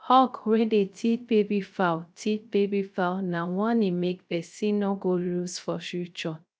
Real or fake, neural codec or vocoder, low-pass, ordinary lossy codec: fake; codec, 16 kHz, 0.3 kbps, FocalCodec; none; none